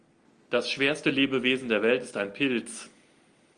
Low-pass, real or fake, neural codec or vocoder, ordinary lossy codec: 9.9 kHz; real; none; Opus, 32 kbps